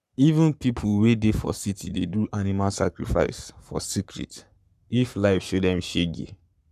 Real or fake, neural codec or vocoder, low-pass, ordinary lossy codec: fake; codec, 44.1 kHz, 7.8 kbps, Pupu-Codec; 14.4 kHz; none